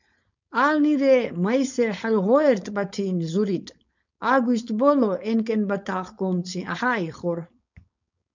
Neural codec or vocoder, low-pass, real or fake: codec, 16 kHz, 4.8 kbps, FACodec; 7.2 kHz; fake